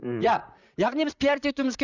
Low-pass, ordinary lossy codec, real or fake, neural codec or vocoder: 7.2 kHz; none; fake; vocoder, 44.1 kHz, 128 mel bands, Pupu-Vocoder